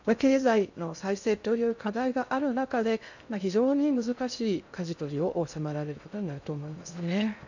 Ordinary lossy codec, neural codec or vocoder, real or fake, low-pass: none; codec, 16 kHz in and 24 kHz out, 0.6 kbps, FocalCodec, streaming, 2048 codes; fake; 7.2 kHz